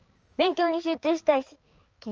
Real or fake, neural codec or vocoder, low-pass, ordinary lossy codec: fake; codec, 16 kHz, 4 kbps, X-Codec, HuBERT features, trained on balanced general audio; 7.2 kHz; Opus, 24 kbps